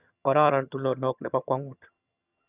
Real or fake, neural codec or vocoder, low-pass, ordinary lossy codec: fake; vocoder, 22.05 kHz, 80 mel bands, HiFi-GAN; 3.6 kHz; none